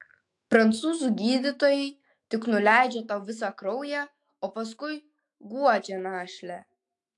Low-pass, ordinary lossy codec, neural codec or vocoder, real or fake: 10.8 kHz; AAC, 64 kbps; autoencoder, 48 kHz, 128 numbers a frame, DAC-VAE, trained on Japanese speech; fake